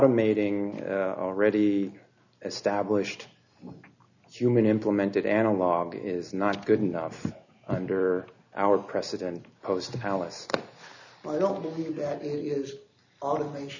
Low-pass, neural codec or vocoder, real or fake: 7.2 kHz; none; real